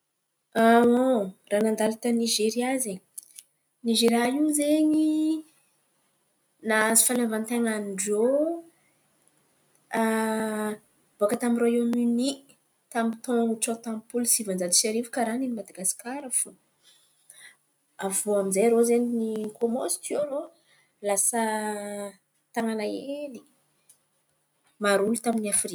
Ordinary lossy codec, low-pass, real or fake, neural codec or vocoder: none; none; real; none